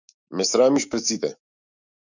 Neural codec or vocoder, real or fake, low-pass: autoencoder, 48 kHz, 128 numbers a frame, DAC-VAE, trained on Japanese speech; fake; 7.2 kHz